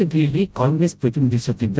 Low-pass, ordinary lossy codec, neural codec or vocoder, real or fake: none; none; codec, 16 kHz, 0.5 kbps, FreqCodec, smaller model; fake